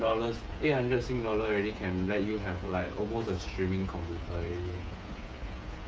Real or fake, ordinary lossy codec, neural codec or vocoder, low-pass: fake; none; codec, 16 kHz, 8 kbps, FreqCodec, smaller model; none